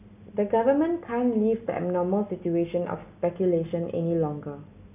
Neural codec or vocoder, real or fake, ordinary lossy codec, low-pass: none; real; none; 3.6 kHz